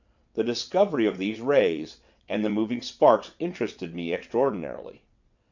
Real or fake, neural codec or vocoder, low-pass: fake; vocoder, 22.05 kHz, 80 mel bands, WaveNeXt; 7.2 kHz